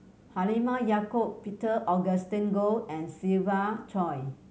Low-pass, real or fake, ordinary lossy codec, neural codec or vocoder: none; real; none; none